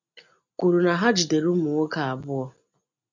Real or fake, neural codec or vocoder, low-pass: real; none; 7.2 kHz